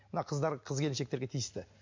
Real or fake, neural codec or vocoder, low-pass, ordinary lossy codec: real; none; 7.2 kHz; MP3, 48 kbps